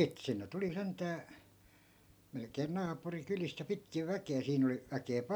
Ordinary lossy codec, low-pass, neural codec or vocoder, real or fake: none; none; none; real